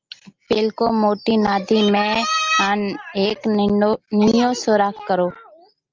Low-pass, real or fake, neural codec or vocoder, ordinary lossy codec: 7.2 kHz; real; none; Opus, 24 kbps